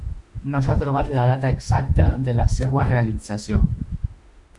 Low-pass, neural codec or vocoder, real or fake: 10.8 kHz; autoencoder, 48 kHz, 32 numbers a frame, DAC-VAE, trained on Japanese speech; fake